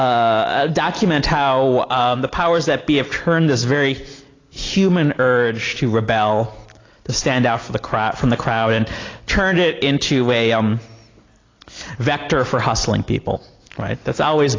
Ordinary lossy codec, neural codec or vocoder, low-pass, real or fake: AAC, 32 kbps; none; 7.2 kHz; real